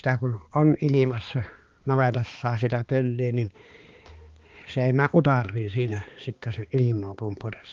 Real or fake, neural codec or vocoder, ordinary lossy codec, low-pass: fake; codec, 16 kHz, 2 kbps, X-Codec, HuBERT features, trained on balanced general audio; Opus, 32 kbps; 7.2 kHz